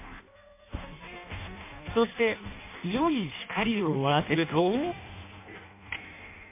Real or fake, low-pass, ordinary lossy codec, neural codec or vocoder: fake; 3.6 kHz; MP3, 24 kbps; codec, 16 kHz in and 24 kHz out, 0.6 kbps, FireRedTTS-2 codec